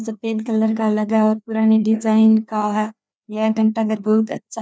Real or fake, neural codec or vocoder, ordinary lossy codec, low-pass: fake; codec, 16 kHz, 2 kbps, FreqCodec, larger model; none; none